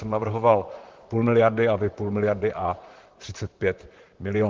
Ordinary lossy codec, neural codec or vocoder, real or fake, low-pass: Opus, 16 kbps; vocoder, 44.1 kHz, 128 mel bands, Pupu-Vocoder; fake; 7.2 kHz